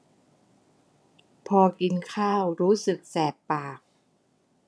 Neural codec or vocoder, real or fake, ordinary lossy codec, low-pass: vocoder, 22.05 kHz, 80 mel bands, WaveNeXt; fake; none; none